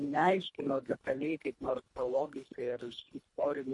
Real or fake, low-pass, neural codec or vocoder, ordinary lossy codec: fake; 10.8 kHz; codec, 24 kHz, 1.5 kbps, HILCodec; MP3, 48 kbps